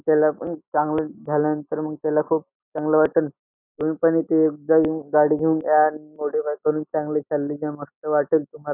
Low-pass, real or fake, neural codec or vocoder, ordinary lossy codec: 3.6 kHz; real; none; none